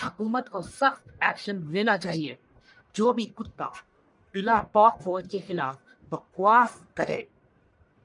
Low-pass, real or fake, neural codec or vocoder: 10.8 kHz; fake; codec, 44.1 kHz, 1.7 kbps, Pupu-Codec